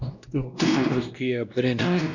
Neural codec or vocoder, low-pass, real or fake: codec, 16 kHz, 1 kbps, X-Codec, WavLM features, trained on Multilingual LibriSpeech; 7.2 kHz; fake